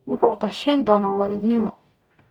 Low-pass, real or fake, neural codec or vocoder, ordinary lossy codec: 19.8 kHz; fake; codec, 44.1 kHz, 0.9 kbps, DAC; none